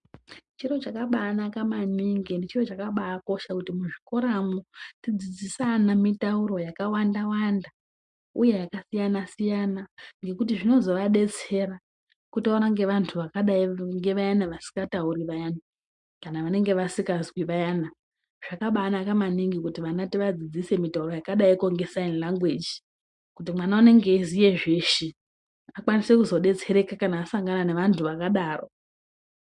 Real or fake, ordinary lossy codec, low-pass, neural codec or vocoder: real; MP3, 64 kbps; 10.8 kHz; none